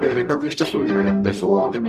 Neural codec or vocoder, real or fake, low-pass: codec, 44.1 kHz, 0.9 kbps, DAC; fake; 14.4 kHz